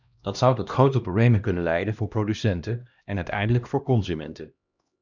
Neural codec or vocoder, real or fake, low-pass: codec, 16 kHz, 1 kbps, X-Codec, HuBERT features, trained on LibriSpeech; fake; 7.2 kHz